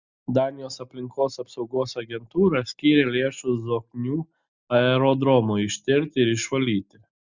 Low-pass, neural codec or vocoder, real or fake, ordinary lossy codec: 7.2 kHz; none; real; Opus, 64 kbps